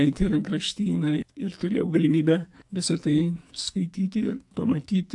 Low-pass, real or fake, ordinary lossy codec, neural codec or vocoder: 10.8 kHz; fake; AAC, 64 kbps; codec, 44.1 kHz, 2.6 kbps, SNAC